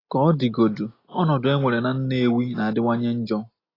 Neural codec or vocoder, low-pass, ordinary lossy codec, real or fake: none; 5.4 kHz; AAC, 24 kbps; real